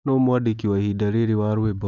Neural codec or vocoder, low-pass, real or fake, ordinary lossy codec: none; 7.2 kHz; real; none